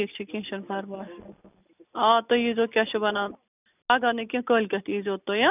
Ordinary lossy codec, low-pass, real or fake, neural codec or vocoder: none; 3.6 kHz; real; none